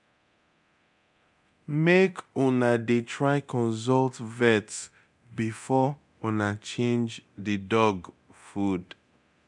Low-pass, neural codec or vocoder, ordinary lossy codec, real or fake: 10.8 kHz; codec, 24 kHz, 0.9 kbps, DualCodec; none; fake